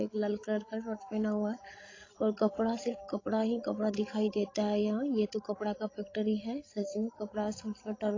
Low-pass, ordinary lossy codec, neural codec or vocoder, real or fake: 7.2 kHz; AAC, 32 kbps; codec, 16 kHz, 16 kbps, FreqCodec, larger model; fake